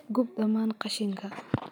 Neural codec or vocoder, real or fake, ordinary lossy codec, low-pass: none; real; none; 19.8 kHz